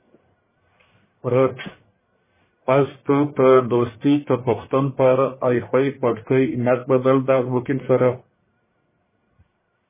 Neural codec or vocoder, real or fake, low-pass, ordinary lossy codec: codec, 44.1 kHz, 1.7 kbps, Pupu-Codec; fake; 3.6 kHz; MP3, 16 kbps